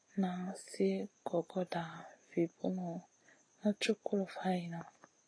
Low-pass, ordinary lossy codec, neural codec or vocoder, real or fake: 9.9 kHz; AAC, 32 kbps; none; real